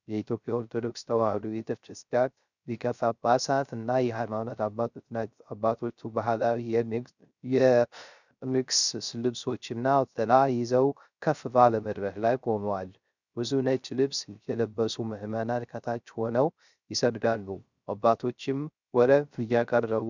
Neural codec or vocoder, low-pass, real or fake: codec, 16 kHz, 0.3 kbps, FocalCodec; 7.2 kHz; fake